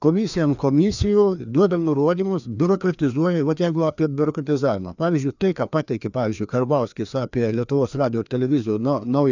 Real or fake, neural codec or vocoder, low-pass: fake; codec, 16 kHz, 2 kbps, FreqCodec, larger model; 7.2 kHz